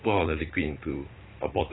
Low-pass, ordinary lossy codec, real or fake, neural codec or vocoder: 7.2 kHz; AAC, 16 kbps; fake; vocoder, 44.1 kHz, 80 mel bands, Vocos